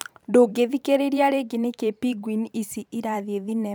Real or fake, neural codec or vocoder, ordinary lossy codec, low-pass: fake; vocoder, 44.1 kHz, 128 mel bands every 512 samples, BigVGAN v2; none; none